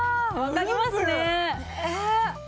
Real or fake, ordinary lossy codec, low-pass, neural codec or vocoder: real; none; none; none